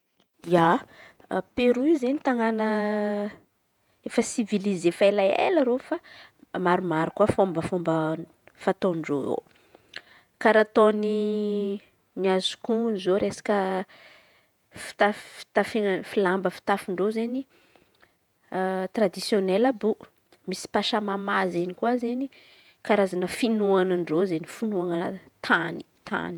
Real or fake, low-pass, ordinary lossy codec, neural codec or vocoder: fake; 19.8 kHz; none; vocoder, 48 kHz, 128 mel bands, Vocos